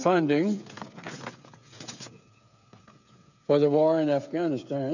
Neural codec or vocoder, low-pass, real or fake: codec, 16 kHz, 8 kbps, FreqCodec, smaller model; 7.2 kHz; fake